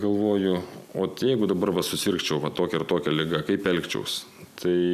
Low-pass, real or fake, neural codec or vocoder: 14.4 kHz; real; none